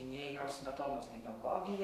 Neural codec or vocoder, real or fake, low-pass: codec, 32 kHz, 1.9 kbps, SNAC; fake; 14.4 kHz